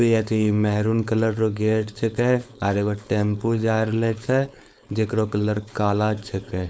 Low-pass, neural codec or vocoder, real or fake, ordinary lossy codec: none; codec, 16 kHz, 4.8 kbps, FACodec; fake; none